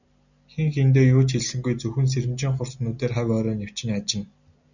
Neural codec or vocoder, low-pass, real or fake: none; 7.2 kHz; real